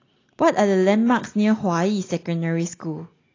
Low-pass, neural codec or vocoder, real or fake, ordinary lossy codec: 7.2 kHz; none; real; AAC, 32 kbps